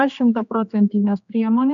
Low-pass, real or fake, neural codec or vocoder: 7.2 kHz; fake; codec, 16 kHz, 2 kbps, X-Codec, HuBERT features, trained on general audio